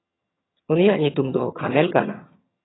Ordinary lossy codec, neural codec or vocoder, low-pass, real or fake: AAC, 16 kbps; vocoder, 22.05 kHz, 80 mel bands, HiFi-GAN; 7.2 kHz; fake